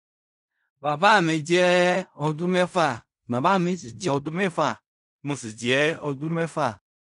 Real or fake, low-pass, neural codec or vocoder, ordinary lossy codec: fake; 10.8 kHz; codec, 16 kHz in and 24 kHz out, 0.4 kbps, LongCat-Audio-Codec, fine tuned four codebook decoder; none